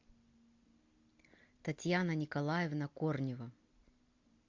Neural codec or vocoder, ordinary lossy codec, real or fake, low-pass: none; MP3, 64 kbps; real; 7.2 kHz